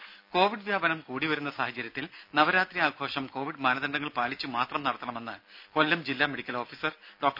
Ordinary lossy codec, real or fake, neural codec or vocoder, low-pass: none; real; none; 5.4 kHz